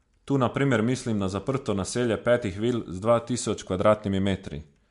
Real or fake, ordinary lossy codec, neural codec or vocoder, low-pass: real; MP3, 64 kbps; none; 10.8 kHz